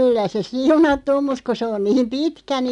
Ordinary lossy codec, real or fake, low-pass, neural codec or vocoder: none; real; 10.8 kHz; none